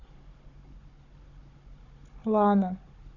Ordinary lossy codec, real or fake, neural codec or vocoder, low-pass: none; fake; codec, 16 kHz, 16 kbps, FunCodec, trained on Chinese and English, 50 frames a second; 7.2 kHz